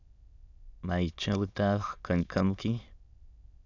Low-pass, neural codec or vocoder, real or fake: 7.2 kHz; autoencoder, 22.05 kHz, a latent of 192 numbers a frame, VITS, trained on many speakers; fake